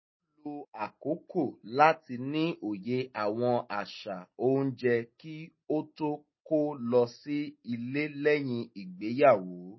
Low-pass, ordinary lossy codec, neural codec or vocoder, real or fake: 7.2 kHz; MP3, 24 kbps; none; real